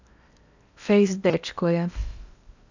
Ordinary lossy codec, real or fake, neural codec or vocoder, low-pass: none; fake; codec, 16 kHz in and 24 kHz out, 0.8 kbps, FocalCodec, streaming, 65536 codes; 7.2 kHz